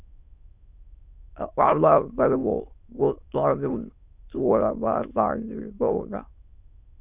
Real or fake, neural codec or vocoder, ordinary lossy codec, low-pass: fake; autoencoder, 22.05 kHz, a latent of 192 numbers a frame, VITS, trained on many speakers; Opus, 64 kbps; 3.6 kHz